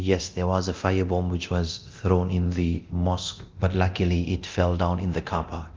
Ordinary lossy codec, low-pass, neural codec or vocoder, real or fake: Opus, 24 kbps; 7.2 kHz; codec, 24 kHz, 0.9 kbps, DualCodec; fake